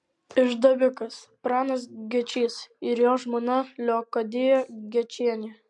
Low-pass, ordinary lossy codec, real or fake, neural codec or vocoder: 10.8 kHz; MP3, 64 kbps; real; none